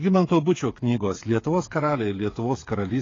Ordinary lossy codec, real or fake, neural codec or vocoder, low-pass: AAC, 32 kbps; fake; codec, 16 kHz, 8 kbps, FreqCodec, smaller model; 7.2 kHz